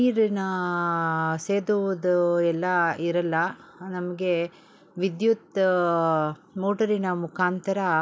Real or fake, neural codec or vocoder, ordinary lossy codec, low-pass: real; none; none; none